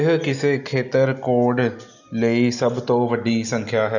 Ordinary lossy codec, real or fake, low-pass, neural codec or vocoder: none; real; 7.2 kHz; none